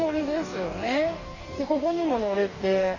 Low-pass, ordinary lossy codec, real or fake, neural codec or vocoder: 7.2 kHz; none; fake; codec, 44.1 kHz, 2.6 kbps, DAC